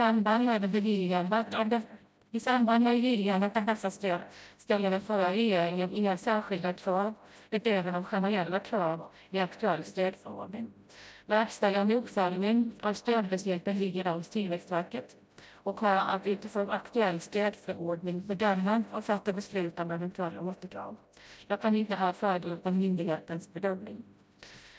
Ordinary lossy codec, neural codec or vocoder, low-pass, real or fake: none; codec, 16 kHz, 0.5 kbps, FreqCodec, smaller model; none; fake